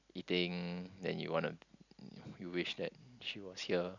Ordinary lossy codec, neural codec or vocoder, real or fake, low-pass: none; none; real; 7.2 kHz